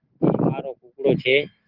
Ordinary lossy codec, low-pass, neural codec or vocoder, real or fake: Opus, 16 kbps; 5.4 kHz; none; real